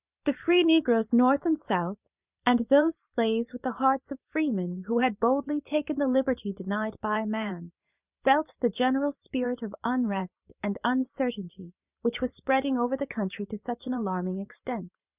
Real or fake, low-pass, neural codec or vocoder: fake; 3.6 kHz; vocoder, 44.1 kHz, 128 mel bands every 512 samples, BigVGAN v2